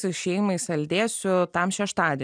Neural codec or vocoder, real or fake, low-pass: none; real; 9.9 kHz